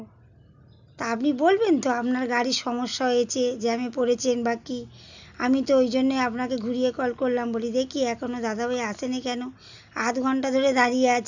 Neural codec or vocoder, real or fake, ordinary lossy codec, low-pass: none; real; none; 7.2 kHz